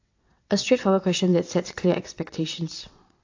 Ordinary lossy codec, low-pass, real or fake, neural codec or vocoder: AAC, 48 kbps; 7.2 kHz; fake; vocoder, 22.05 kHz, 80 mel bands, WaveNeXt